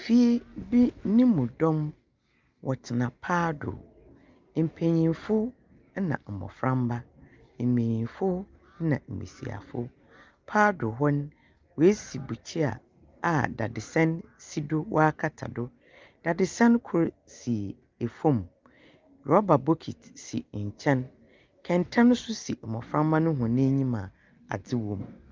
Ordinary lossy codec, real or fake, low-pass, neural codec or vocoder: Opus, 24 kbps; real; 7.2 kHz; none